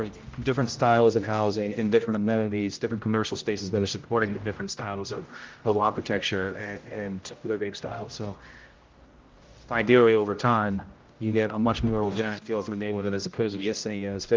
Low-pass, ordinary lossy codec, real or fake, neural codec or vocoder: 7.2 kHz; Opus, 24 kbps; fake; codec, 16 kHz, 0.5 kbps, X-Codec, HuBERT features, trained on general audio